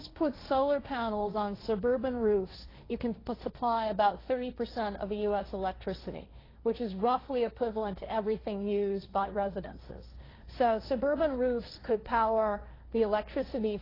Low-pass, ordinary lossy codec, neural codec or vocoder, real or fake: 5.4 kHz; AAC, 24 kbps; codec, 16 kHz, 1.1 kbps, Voila-Tokenizer; fake